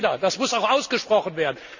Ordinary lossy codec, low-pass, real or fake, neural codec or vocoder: none; 7.2 kHz; real; none